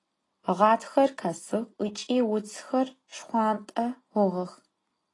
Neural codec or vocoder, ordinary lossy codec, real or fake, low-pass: none; AAC, 32 kbps; real; 10.8 kHz